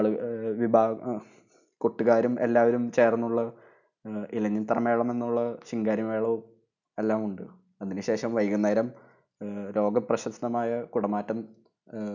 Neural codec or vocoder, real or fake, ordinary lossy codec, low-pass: none; real; none; 7.2 kHz